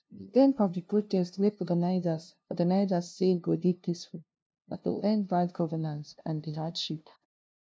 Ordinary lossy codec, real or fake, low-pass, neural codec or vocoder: none; fake; none; codec, 16 kHz, 0.5 kbps, FunCodec, trained on LibriTTS, 25 frames a second